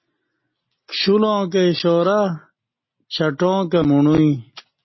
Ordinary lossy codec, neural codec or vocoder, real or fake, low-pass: MP3, 24 kbps; none; real; 7.2 kHz